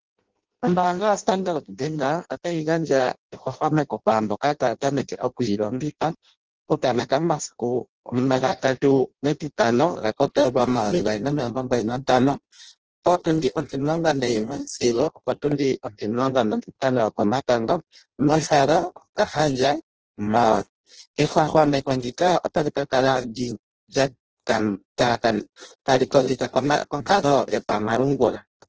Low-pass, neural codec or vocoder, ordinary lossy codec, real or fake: 7.2 kHz; codec, 16 kHz in and 24 kHz out, 0.6 kbps, FireRedTTS-2 codec; Opus, 16 kbps; fake